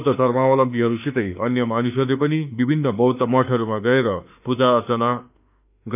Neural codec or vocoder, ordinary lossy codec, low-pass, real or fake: autoencoder, 48 kHz, 32 numbers a frame, DAC-VAE, trained on Japanese speech; none; 3.6 kHz; fake